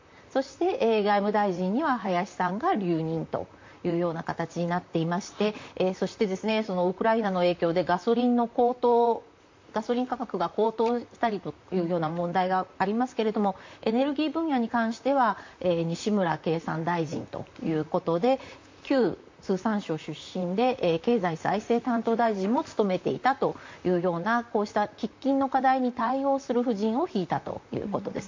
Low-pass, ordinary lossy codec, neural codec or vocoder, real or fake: 7.2 kHz; MP3, 48 kbps; vocoder, 44.1 kHz, 128 mel bands, Pupu-Vocoder; fake